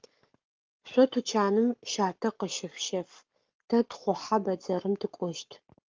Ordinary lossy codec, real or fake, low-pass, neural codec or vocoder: Opus, 24 kbps; fake; 7.2 kHz; codec, 44.1 kHz, 7.8 kbps, Pupu-Codec